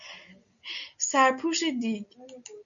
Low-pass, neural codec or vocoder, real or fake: 7.2 kHz; none; real